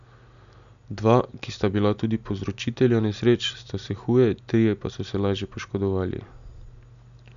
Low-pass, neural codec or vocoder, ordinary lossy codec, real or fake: 7.2 kHz; none; none; real